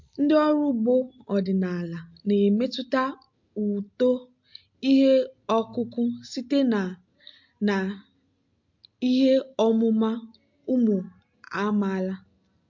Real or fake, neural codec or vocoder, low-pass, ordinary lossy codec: real; none; 7.2 kHz; MP3, 48 kbps